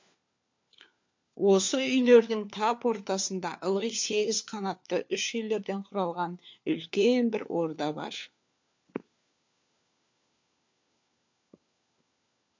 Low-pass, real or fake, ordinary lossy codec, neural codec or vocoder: 7.2 kHz; fake; MP3, 48 kbps; codec, 16 kHz, 4 kbps, FunCodec, trained on LibriTTS, 50 frames a second